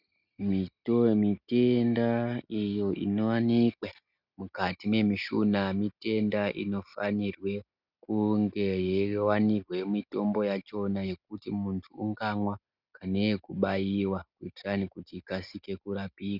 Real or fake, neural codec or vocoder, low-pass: real; none; 5.4 kHz